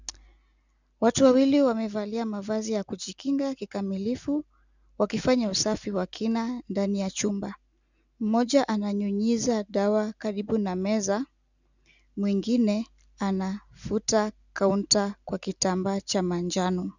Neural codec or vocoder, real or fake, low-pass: none; real; 7.2 kHz